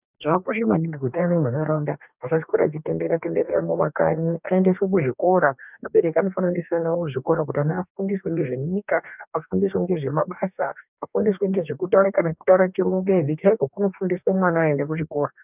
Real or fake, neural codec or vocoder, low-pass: fake; codec, 44.1 kHz, 2.6 kbps, DAC; 3.6 kHz